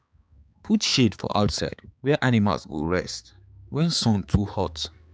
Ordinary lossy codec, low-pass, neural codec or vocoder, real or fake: none; none; codec, 16 kHz, 4 kbps, X-Codec, HuBERT features, trained on balanced general audio; fake